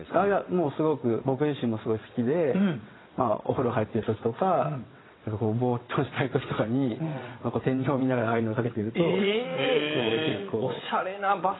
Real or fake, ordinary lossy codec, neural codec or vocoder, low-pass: fake; AAC, 16 kbps; vocoder, 22.05 kHz, 80 mel bands, WaveNeXt; 7.2 kHz